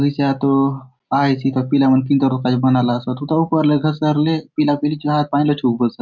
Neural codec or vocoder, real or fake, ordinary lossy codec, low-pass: none; real; none; 7.2 kHz